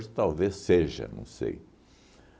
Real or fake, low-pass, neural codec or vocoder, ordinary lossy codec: real; none; none; none